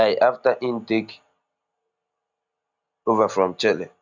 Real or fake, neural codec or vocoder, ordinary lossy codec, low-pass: real; none; none; 7.2 kHz